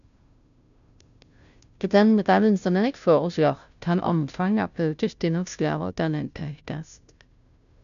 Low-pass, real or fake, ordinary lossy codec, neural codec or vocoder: 7.2 kHz; fake; none; codec, 16 kHz, 0.5 kbps, FunCodec, trained on Chinese and English, 25 frames a second